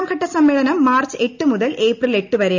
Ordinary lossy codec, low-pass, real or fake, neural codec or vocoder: none; 7.2 kHz; real; none